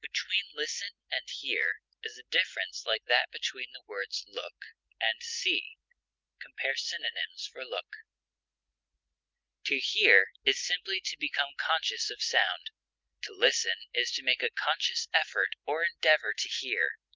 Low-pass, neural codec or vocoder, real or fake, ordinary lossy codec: 7.2 kHz; none; real; Opus, 24 kbps